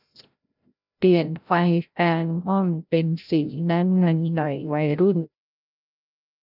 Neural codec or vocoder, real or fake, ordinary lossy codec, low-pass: codec, 16 kHz, 0.5 kbps, FreqCodec, larger model; fake; none; 5.4 kHz